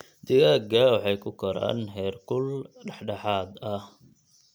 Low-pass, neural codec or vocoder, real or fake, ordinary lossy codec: none; none; real; none